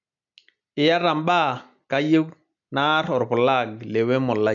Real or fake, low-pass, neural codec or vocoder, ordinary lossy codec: real; 7.2 kHz; none; none